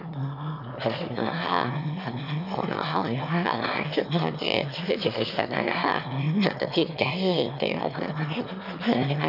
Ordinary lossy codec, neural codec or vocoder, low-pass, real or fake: none; autoencoder, 22.05 kHz, a latent of 192 numbers a frame, VITS, trained on one speaker; 5.4 kHz; fake